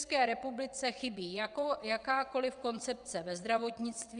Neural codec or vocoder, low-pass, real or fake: vocoder, 22.05 kHz, 80 mel bands, WaveNeXt; 9.9 kHz; fake